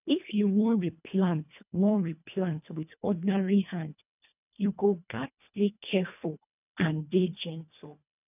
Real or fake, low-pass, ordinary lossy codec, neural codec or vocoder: fake; 3.6 kHz; none; codec, 24 kHz, 1.5 kbps, HILCodec